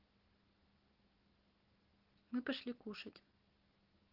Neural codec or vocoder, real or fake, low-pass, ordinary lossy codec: none; real; 5.4 kHz; Opus, 16 kbps